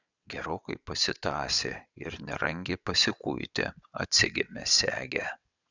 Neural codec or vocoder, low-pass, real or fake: vocoder, 22.05 kHz, 80 mel bands, WaveNeXt; 7.2 kHz; fake